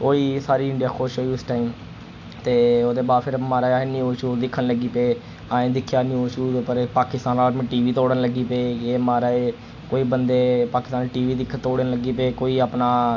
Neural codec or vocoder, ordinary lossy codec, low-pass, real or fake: none; AAC, 48 kbps; 7.2 kHz; real